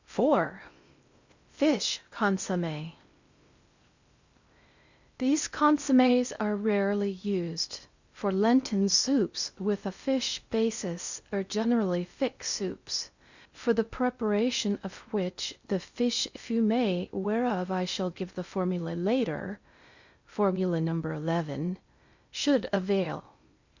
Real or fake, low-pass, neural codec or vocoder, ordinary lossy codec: fake; 7.2 kHz; codec, 16 kHz in and 24 kHz out, 0.6 kbps, FocalCodec, streaming, 4096 codes; Opus, 64 kbps